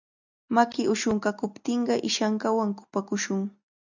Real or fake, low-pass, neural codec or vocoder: real; 7.2 kHz; none